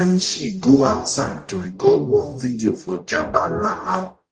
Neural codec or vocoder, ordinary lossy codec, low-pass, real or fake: codec, 44.1 kHz, 0.9 kbps, DAC; Opus, 32 kbps; 9.9 kHz; fake